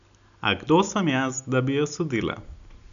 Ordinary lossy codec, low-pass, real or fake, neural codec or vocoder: none; 7.2 kHz; real; none